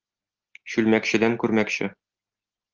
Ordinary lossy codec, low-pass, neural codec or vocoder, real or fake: Opus, 32 kbps; 7.2 kHz; none; real